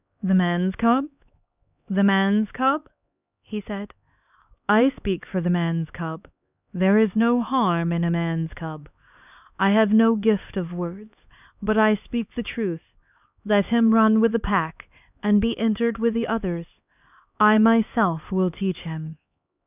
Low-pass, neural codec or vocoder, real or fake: 3.6 kHz; codec, 16 kHz, 2 kbps, X-Codec, HuBERT features, trained on LibriSpeech; fake